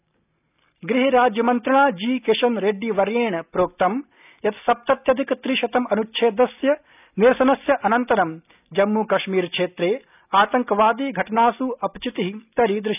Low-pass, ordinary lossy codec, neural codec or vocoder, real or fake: 3.6 kHz; none; none; real